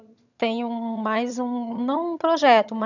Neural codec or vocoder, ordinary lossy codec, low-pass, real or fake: vocoder, 22.05 kHz, 80 mel bands, HiFi-GAN; none; 7.2 kHz; fake